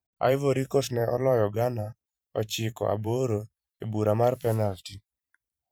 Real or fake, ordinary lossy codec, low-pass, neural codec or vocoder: fake; none; none; vocoder, 44.1 kHz, 128 mel bands every 512 samples, BigVGAN v2